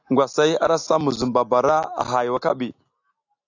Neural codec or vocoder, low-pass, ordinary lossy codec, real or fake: none; 7.2 kHz; AAC, 48 kbps; real